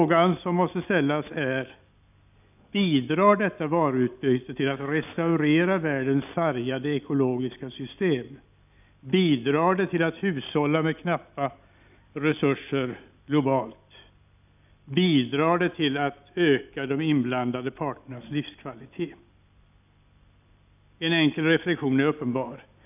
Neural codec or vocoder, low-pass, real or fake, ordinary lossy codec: none; 3.6 kHz; real; none